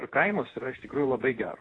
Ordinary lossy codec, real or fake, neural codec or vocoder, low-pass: AAC, 32 kbps; fake; vocoder, 48 kHz, 128 mel bands, Vocos; 9.9 kHz